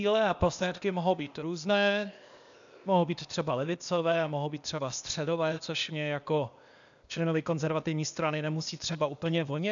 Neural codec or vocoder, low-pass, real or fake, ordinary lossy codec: codec, 16 kHz, 0.8 kbps, ZipCodec; 7.2 kHz; fake; MP3, 96 kbps